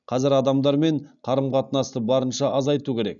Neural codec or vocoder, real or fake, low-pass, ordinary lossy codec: none; real; 7.2 kHz; none